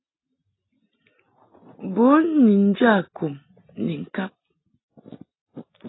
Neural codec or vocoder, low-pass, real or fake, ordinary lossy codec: none; 7.2 kHz; real; AAC, 16 kbps